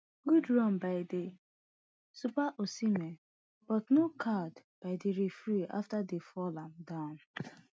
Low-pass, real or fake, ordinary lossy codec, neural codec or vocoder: none; real; none; none